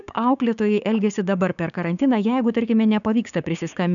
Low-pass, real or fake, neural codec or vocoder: 7.2 kHz; fake; codec, 16 kHz, 6 kbps, DAC